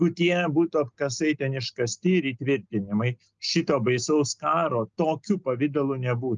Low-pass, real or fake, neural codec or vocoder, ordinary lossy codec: 7.2 kHz; real; none; Opus, 24 kbps